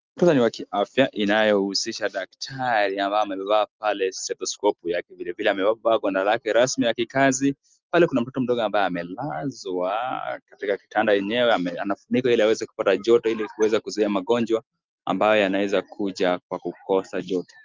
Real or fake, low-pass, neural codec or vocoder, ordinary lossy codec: real; 7.2 kHz; none; Opus, 32 kbps